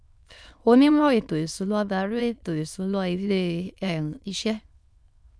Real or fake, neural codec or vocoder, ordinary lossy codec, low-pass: fake; autoencoder, 22.05 kHz, a latent of 192 numbers a frame, VITS, trained on many speakers; none; none